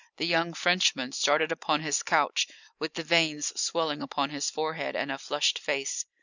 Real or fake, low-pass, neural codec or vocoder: real; 7.2 kHz; none